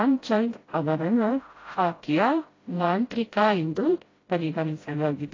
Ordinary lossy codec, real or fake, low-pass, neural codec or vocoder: AAC, 32 kbps; fake; 7.2 kHz; codec, 16 kHz, 0.5 kbps, FreqCodec, smaller model